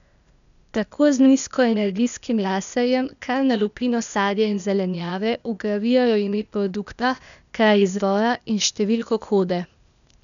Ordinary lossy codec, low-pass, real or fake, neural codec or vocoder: none; 7.2 kHz; fake; codec, 16 kHz, 0.8 kbps, ZipCodec